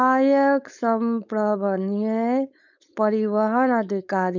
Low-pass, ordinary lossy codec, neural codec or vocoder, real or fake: 7.2 kHz; none; codec, 16 kHz, 4.8 kbps, FACodec; fake